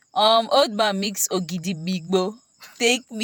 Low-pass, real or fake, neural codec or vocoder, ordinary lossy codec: none; fake; vocoder, 48 kHz, 128 mel bands, Vocos; none